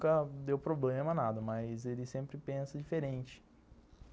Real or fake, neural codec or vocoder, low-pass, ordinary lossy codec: real; none; none; none